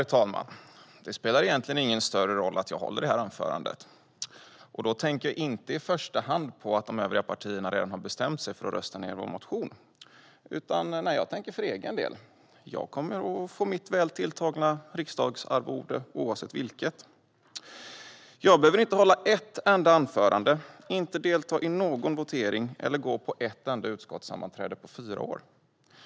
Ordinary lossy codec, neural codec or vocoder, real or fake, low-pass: none; none; real; none